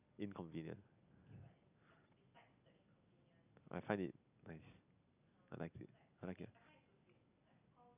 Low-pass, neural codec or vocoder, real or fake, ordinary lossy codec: 3.6 kHz; none; real; none